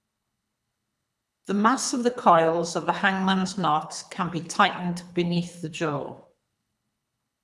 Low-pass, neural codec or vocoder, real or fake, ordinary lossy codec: none; codec, 24 kHz, 3 kbps, HILCodec; fake; none